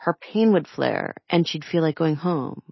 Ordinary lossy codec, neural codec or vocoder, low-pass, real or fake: MP3, 24 kbps; none; 7.2 kHz; real